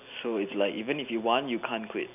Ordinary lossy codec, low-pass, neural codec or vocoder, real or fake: none; 3.6 kHz; none; real